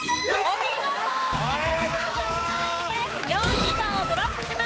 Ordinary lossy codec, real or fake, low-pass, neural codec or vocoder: none; fake; none; codec, 16 kHz, 4 kbps, X-Codec, HuBERT features, trained on balanced general audio